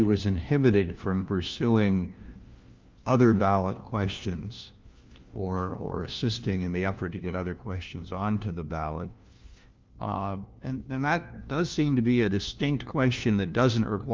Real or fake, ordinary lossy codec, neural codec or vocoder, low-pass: fake; Opus, 24 kbps; codec, 16 kHz, 1 kbps, FunCodec, trained on LibriTTS, 50 frames a second; 7.2 kHz